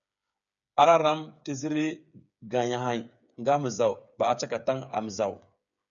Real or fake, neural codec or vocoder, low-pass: fake; codec, 16 kHz, 8 kbps, FreqCodec, smaller model; 7.2 kHz